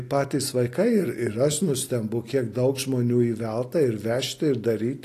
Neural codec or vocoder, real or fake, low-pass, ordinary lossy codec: none; real; 14.4 kHz; AAC, 48 kbps